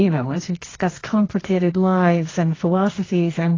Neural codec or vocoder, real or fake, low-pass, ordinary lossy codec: codec, 24 kHz, 0.9 kbps, WavTokenizer, medium music audio release; fake; 7.2 kHz; AAC, 32 kbps